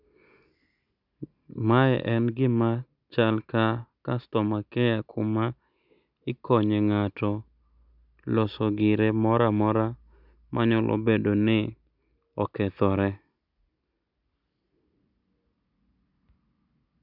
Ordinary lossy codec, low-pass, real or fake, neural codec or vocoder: none; 5.4 kHz; real; none